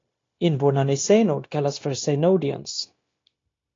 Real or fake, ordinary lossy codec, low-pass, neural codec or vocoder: fake; AAC, 32 kbps; 7.2 kHz; codec, 16 kHz, 0.9 kbps, LongCat-Audio-Codec